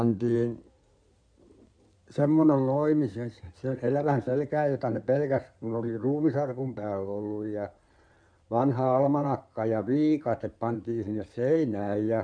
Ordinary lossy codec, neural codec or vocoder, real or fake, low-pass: none; codec, 16 kHz in and 24 kHz out, 2.2 kbps, FireRedTTS-2 codec; fake; 9.9 kHz